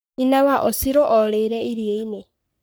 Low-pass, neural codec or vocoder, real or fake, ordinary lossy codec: none; codec, 44.1 kHz, 3.4 kbps, Pupu-Codec; fake; none